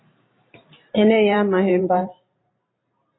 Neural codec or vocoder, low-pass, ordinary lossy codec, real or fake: vocoder, 44.1 kHz, 128 mel bands every 512 samples, BigVGAN v2; 7.2 kHz; AAC, 16 kbps; fake